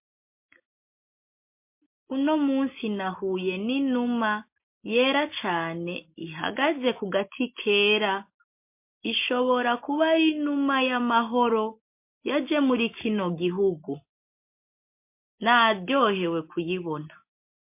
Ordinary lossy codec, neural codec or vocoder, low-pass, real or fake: MP3, 24 kbps; none; 3.6 kHz; real